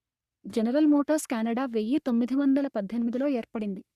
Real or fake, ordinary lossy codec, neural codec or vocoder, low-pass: fake; Opus, 64 kbps; codec, 44.1 kHz, 3.4 kbps, Pupu-Codec; 14.4 kHz